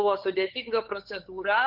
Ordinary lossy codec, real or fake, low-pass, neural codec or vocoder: Opus, 16 kbps; fake; 5.4 kHz; codec, 16 kHz, 8 kbps, FunCodec, trained on LibriTTS, 25 frames a second